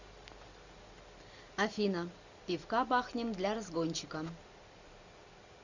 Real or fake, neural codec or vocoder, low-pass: real; none; 7.2 kHz